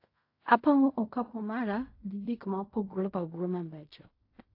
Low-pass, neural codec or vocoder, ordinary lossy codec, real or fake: 5.4 kHz; codec, 16 kHz in and 24 kHz out, 0.4 kbps, LongCat-Audio-Codec, fine tuned four codebook decoder; AAC, 32 kbps; fake